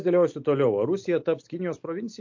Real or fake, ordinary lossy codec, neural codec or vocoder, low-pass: real; MP3, 48 kbps; none; 7.2 kHz